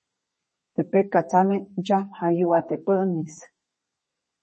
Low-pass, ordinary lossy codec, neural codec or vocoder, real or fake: 10.8 kHz; MP3, 32 kbps; codec, 32 kHz, 1.9 kbps, SNAC; fake